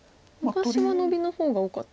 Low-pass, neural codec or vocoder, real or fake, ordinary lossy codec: none; none; real; none